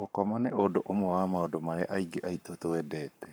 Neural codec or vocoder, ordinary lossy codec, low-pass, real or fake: codec, 44.1 kHz, 7.8 kbps, Pupu-Codec; none; none; fake